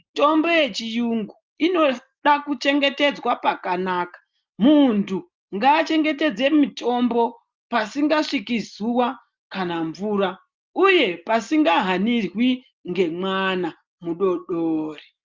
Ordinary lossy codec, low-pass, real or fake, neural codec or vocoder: Opus, 24 kbps; 7.2 kHz; real; none